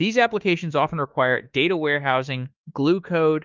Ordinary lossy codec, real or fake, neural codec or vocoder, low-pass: Opus, 32 kbps; fake; autoencoder, 48 kHz, 32 numbers a frame, DAC-VAE, trained on Japanese speech; 7.2 kHz